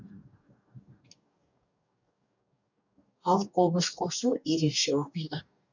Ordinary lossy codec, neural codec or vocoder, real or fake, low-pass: none; codec, 44.1 kHz, 2.6 kbps, DAC; fake; 7.2 kHz